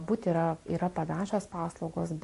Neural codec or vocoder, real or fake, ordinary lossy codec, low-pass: none; real; MP3, 48 kbps; 14.4 kHz